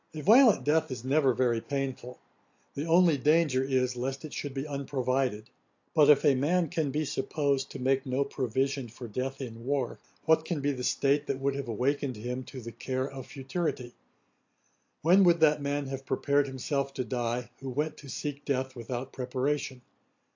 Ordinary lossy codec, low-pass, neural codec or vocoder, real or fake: AAC, 48 kbps; 7.2 kHz; none; real